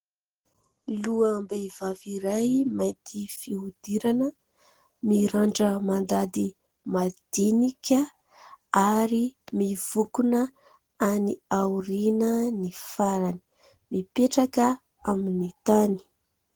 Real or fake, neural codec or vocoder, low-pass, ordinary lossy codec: real; none; 19.8 kHz; Opus, 16 kbps